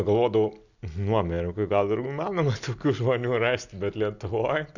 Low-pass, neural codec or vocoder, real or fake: 7.2 kHz; none; real